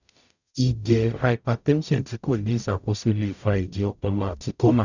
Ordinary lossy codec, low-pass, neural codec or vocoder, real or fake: none; 7.2 kHz; codec, 44.1 kHz, 0.9 kbps, DAC; fake